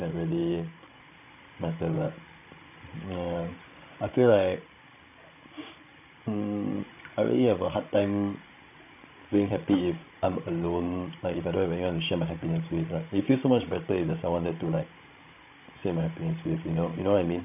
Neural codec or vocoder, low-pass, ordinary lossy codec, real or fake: codec, 16 kHz, 16 kbps, FreqCodec, larger model; 3.6 kHz; none; fake